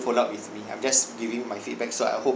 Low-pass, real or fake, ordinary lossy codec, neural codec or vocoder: none; real; none; none